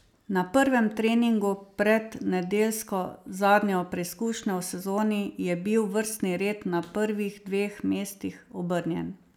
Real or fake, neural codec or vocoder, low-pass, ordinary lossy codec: real; none; 19.8 kHz; none